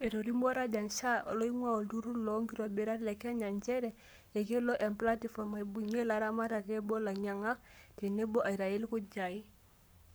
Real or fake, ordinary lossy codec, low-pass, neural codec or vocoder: fake; none; none; codec, 44.1 kHz, 7.8 kbps, Pupu-Codec